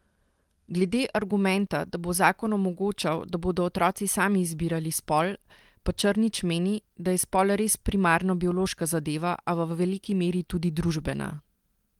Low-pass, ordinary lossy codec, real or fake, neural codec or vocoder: 19.8 kHz; Opus, 24 kbps; real; none